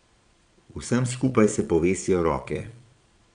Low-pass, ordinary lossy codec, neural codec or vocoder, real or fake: 9.9 kHz; none; vocoder, 22.05 kHz, 80 mel bands, Vocos; fake